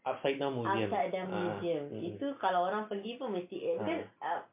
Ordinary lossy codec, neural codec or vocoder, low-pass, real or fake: MP3, 32 kbps; none; 3.6 kHz; real